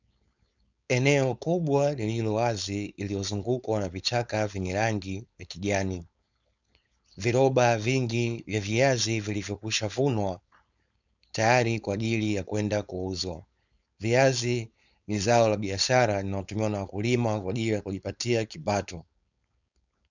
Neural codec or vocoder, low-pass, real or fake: codec, 16 kHz, 4.8 kbps, FACodec; 7.2 kHz; fake